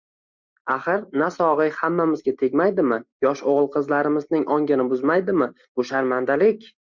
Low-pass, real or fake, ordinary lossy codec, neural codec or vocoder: 7.2 kHz; real; MP3, 64 kbps; none